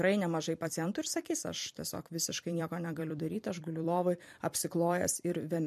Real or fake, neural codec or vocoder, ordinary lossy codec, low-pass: fake; vocoder, 44.1 kHz, 128 mel bands every 256 samples, BigVGAN v2; MP3, 64 kbps; 14.4 kHz